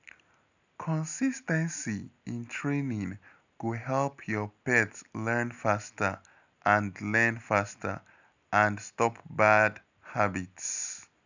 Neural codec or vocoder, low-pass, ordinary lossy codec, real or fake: none; 7.2 kHz; none; real